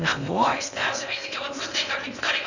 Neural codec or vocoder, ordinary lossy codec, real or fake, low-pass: codec, 16 kHz in and 24 kHz out, 0.6 kbps, FocalCodec, streaming, 2048 codes; none; fake; 7.2 kHz